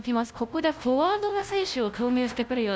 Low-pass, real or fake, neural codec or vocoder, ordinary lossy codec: none; fake; codec, 16 kHz, 0.5 kbps, FunCodec, trained on LibriTTS, 25 frames a second; none